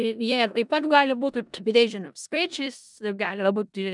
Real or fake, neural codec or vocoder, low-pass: fake; codec, 16 kHz in and 24 kHz out, 0.4 kbps, LongCat-Audio-Codec, four codebook decoder; 10.8 kHz